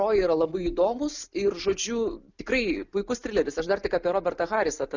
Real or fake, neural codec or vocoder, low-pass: real; none; 7.2 kHz